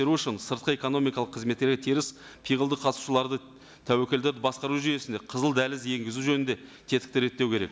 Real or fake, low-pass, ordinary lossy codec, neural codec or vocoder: real; none; none; none